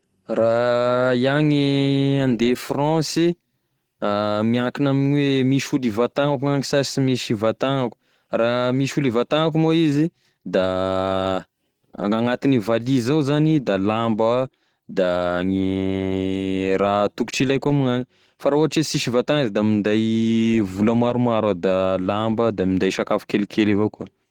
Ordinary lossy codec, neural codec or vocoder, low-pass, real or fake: Opus, 16 kbps; none; 19.8 kHz; real